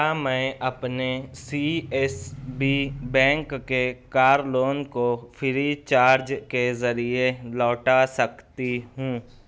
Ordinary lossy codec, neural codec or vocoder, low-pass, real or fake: none; none; none; real